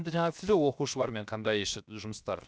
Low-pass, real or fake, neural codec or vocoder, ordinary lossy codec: none; fake; codec, 16 kHz, 0.7 kbps, FocalCodec; none